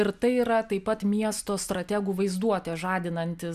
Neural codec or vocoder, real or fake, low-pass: none; real; 14.4 kHz